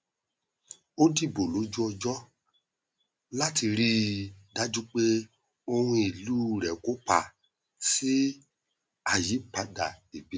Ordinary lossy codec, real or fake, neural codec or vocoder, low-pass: none; real; none; none